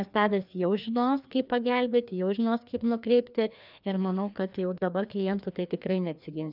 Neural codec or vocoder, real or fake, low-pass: codec, 16 kHz, 2 kbps, FreqCodec, larger model; fake; 5.4 kHz